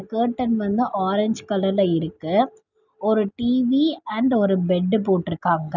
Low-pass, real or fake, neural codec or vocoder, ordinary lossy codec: 7.2 kHz; real; none; none